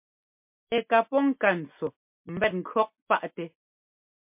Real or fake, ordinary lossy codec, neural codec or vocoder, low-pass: real; MP3, 32 kbps; none; 3.6 kHz